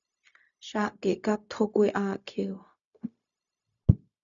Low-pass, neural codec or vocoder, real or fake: 7.2 kHz; codec, 16 kHz, 0.4 kbps, LongCat-Audio-Codec; fake